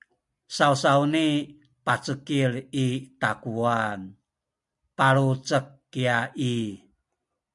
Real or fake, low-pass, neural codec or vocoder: real; 10.8 kHz; none